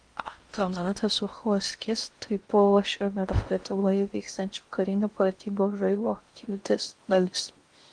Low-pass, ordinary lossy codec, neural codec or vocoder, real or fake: 9.9 kHz; Opus, 24 kbps; codec, 16 kHz in and 24 kHz out, 0.6 kbps, FocalCodec, streaming, 2048 codes; fake